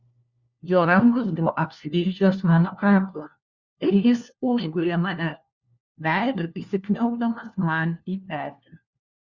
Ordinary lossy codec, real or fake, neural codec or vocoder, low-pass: Opus, 64 kbps; fake; codec, 16 kHz, 1 kbps, FunCodec, trained on LibriTTS, 50 frames a second; 7.2 kHz